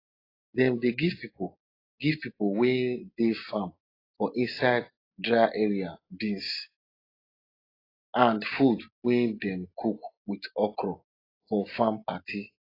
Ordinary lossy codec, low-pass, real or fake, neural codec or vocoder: AAC, 24 kbps; 5.4 kHz; real; none